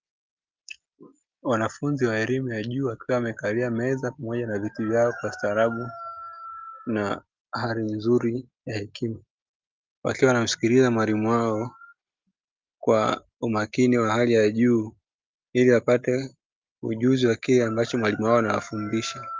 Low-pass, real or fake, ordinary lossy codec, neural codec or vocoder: 7.2 kHz; real; Opus, 24 kbps; none